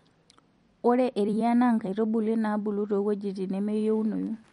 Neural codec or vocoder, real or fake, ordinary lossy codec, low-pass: vocoder, 44.1 kHz, 128 mel bands every 256 samples, BigVGAN v2; fake; MP3, 48 kbps; 19.8 kHz